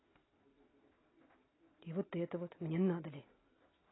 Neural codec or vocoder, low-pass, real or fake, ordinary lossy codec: none; 7.2 kHz; real; AAC, 16 kbps